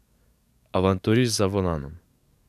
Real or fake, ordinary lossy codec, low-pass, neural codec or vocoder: fake; none; 14.4 kHz; codec, 44.1 kHz, 7.8 kbps, DAC